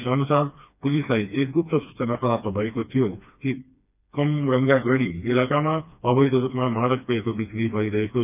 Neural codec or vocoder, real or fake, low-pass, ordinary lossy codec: codec, 16 kHz, 2 kbps, FreqCodec, smaller model; fake; 3.6 kHz; none